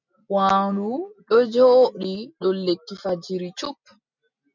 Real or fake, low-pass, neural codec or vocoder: real; 7.2 kHz; none